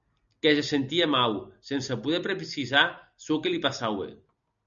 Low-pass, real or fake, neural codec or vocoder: 7.2 kHz; real; none